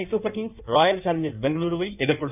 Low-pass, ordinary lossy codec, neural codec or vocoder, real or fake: 3.6 kHz; none; codec, 16 kHz in and 24 kHz out, 1.1 kbps, FireRedTTS-2 codec; fake